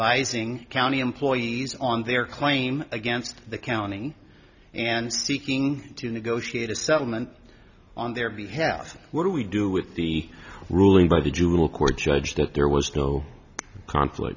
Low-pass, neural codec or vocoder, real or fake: 7.2 kHz; none; real